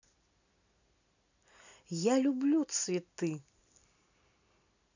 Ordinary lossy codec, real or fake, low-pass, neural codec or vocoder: none; real; 7.2 kHz; none